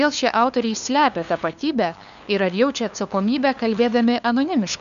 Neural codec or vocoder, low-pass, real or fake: codec, 16 kHz, 2 kbps, FunCodec, trained on LibriTTS, 25 frames a second; 7.2 kHz; fake